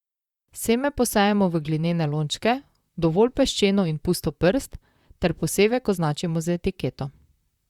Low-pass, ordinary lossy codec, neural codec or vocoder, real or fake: 19.8 kHz; Opus, 64 kbps; vocoder, 44.1 kHz, 128 mel bands, Pupu-Vocoder; fake